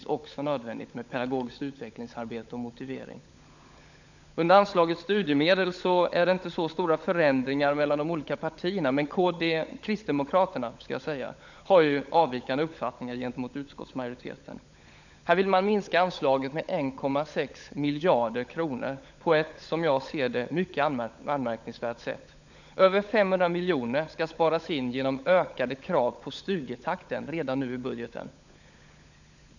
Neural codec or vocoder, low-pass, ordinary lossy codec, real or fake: codec, 16 kHz, 16 kbps, FunCodec, trained on LibriTTS, 50 frames a second; 7.2 kHz; none; fake